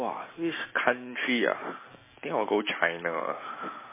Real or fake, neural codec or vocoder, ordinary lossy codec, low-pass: real; none; MP3, 16 kbps; 3.6 kHz